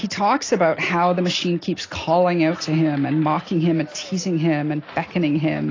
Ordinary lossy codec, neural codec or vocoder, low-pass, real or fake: AAC, 32 kbps; none; 7.2 kHz; real